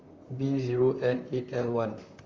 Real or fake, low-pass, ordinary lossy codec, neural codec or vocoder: fake; 7.2 kHz; Opus, 32 kbps; vocoder, 44.1 kHz, 128 mel bands, Pupu-Vocoder